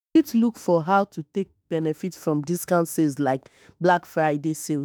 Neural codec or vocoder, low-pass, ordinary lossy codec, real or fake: autoencoder, 48 kHz, 32 numbers a frame, DAC-VAE, trained on Japanese speech; none; none; fake